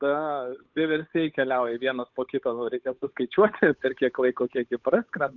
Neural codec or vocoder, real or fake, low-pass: codec, 16 kHz, 8 kbps, FunCodec, trained on Chinese and English, 25 frames a second; fake; 7.2 kHz